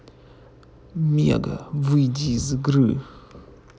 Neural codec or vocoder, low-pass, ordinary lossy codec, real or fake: none; none; none; real